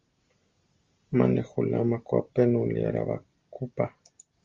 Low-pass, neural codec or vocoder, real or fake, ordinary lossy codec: 7.2 kHz; none; real; Opus, 32 kbps